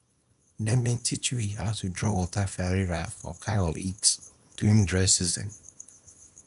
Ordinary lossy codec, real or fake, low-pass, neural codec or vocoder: none; fake; 10.8 kHz; codec, 24 kHz, 0.9 kbps, WavTokenizer, small release